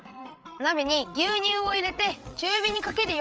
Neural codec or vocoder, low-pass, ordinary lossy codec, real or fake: codec, 16 kHz, 8 kbps, FreqCodec, larger model; none; none; fake